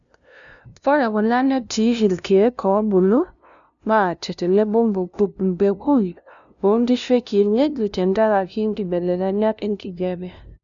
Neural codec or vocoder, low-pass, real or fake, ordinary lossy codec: codec, 16 kHz, 0.5 kbps, FunCodec, trained on LibriTTS, 25 frames a second; 7.2 kHz; fake; MP3, 96 kbps